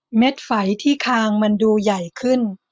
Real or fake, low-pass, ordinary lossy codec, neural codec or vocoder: real; none; none; none